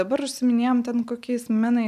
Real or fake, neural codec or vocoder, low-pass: real; none; 14.4 kHz